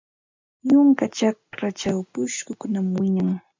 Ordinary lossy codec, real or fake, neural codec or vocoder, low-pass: MP3, 64 kbps; real; none; 7.2 kHz